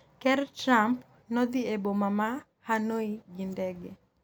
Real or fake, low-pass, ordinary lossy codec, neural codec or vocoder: real; none; none; none